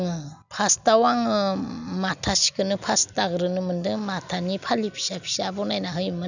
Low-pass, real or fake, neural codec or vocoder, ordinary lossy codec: 7.2 kHz; real; none; none